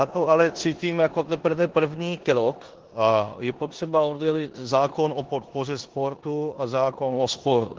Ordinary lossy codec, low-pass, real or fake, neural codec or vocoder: Opus, 16 kbps; 7.2 kHz; fake; codec, 16 kHz in and 24 kHz out, 0.9 kbps, LongCat-Audio-Codec, four codebook decoder